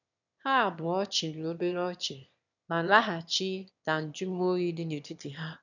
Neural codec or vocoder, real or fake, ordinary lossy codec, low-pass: autoencoder, 22.05 kHz, a latent of 192 numbers a frame, VITS, trained on one speaker; fake; none; 7.2 kHz